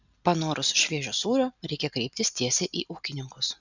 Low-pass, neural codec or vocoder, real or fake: 7.2 kHz; none; real